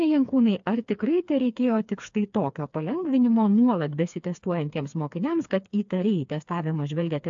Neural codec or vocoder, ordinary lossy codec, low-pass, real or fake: codec, 16 kHz, 4 kbps, FreqCodec, smaller model; AAC, 64 kbps; 7.2 kHz; fake